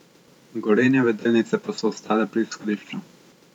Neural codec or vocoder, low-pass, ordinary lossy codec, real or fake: vocoder, 44.1 kHz, 128 mel bands every 512 samples, BigVGAN v2; 19.8 kHz; none; fake